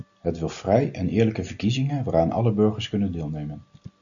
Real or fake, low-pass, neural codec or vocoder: real; 7.2 kHz; none